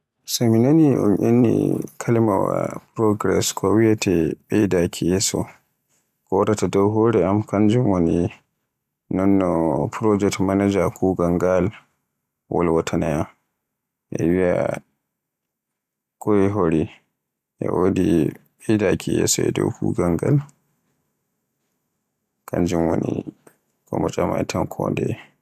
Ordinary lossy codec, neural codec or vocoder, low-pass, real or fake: none; none; 14.4 kHz; real